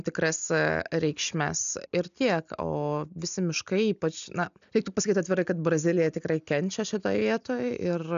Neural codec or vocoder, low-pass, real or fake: none; 7.2 kHz; real